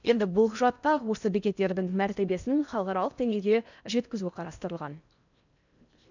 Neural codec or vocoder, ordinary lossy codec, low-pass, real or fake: codec, 16 kHz in and 24 kHz out, 0.8 kbps, FocalCodec, streaming, 65536 codes; none; 7.2 kHz; fake